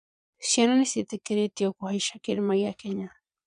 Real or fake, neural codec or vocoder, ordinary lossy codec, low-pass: fake; vocoder, 22.05 kHz, 80 mel bands, Vocos; none; 9.9 kHz